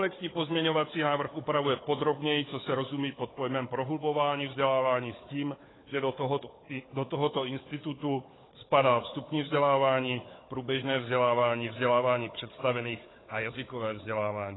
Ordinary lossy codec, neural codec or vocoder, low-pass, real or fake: AAC, 16 kbps; codec, 16 kHz, 4 kbps, FunCodec, trained on Chinese and English, 50 frames a second; 7.2 kHz; fake